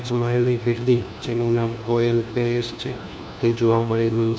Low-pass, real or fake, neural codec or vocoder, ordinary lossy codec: none; fake; codec, 16 kHz, 1 kbps, FunCodec, trained on LibriTTS, 50 frames a second; none